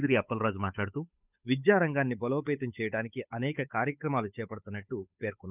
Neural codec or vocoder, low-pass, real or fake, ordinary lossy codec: codec, 16 kHz, 8 kbps, FunCodec, trained on Chinese and English, 25 frames a second; 3.6 kHz; fake; none